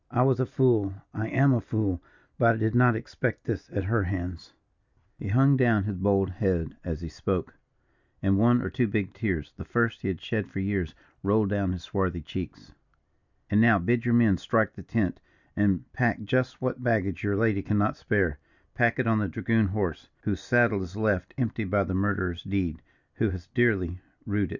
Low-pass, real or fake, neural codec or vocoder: 7.2 kHz; real; none